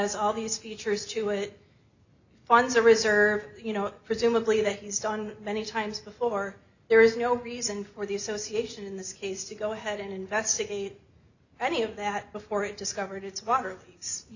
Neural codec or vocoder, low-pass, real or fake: none; 7.2 kHz; real